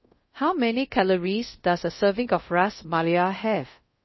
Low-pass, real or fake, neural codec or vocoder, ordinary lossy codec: 7.2 kHz; fake; codec, 24 kHz, 0.5 kbps, DualCodec; MP3, 24 kbps